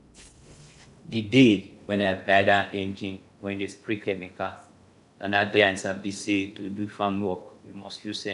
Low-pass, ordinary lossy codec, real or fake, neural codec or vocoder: 10.8 kHz; none; fake; codec, 16 kHz in and 24 kHz out, 0.6 kbps, FocalCodec, streaming, 2048 codes